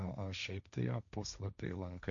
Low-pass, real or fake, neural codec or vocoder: 7.2 kHz; fake; codec, 16 kHz, 1.1 kbps, Voila-Tokenizer